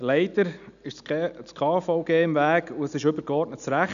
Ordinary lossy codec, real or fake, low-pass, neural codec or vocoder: none; real; 7.2 kHz; none